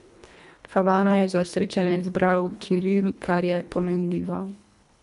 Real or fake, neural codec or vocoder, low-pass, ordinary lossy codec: fake; codec, 24 kHz, 1.5 kbps, HILCodec; 10.8 kHz; none